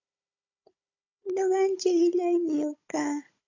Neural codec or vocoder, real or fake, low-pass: codec, 16 kHz, 16 kbps, FunCodec, trained on Chinese and English, 50 frames a second; fake; 7.2 kHz